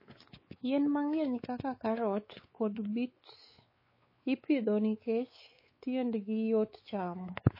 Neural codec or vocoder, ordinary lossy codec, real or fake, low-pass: codec, 16 kHz, 4 kbps, X-Codec, WavLM features, trained on Multilingual LibriSpeech; MP3, 24 kbps; fake; 5.4 kHz